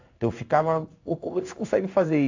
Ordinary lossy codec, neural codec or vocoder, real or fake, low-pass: AAC, 48 kbps; codec, 16 kHz in and 24 kHz out, 1 kbps, XY-Tokenizer; fake; 7.2 kHz